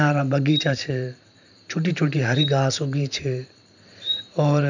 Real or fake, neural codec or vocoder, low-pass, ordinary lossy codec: fake; vocoder, 44.1 kHz, 128 mel bands every 512 samples, BigVGAN v2; 7.2 kHz; none